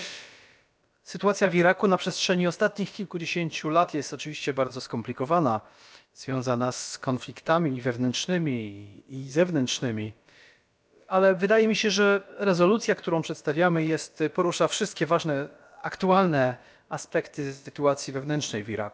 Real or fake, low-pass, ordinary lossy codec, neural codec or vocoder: fake; none; none; codec, 16 kHz, about 1 kbps, DyCAST, with the encoder's durations